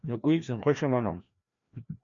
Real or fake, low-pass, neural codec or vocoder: fake; 7.2 kHz; codec, 16 kHz, 1 kbps, FreqCodec, larger model